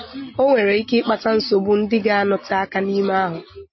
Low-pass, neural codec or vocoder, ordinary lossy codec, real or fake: 7.2 kHz; none; MP3, 24 kbps; real